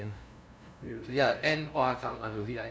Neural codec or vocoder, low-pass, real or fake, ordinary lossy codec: codec, 16 kHz, 0.5 kbps, FunCodec, trained on LibriTTS, 25 frames a second; none; fake; none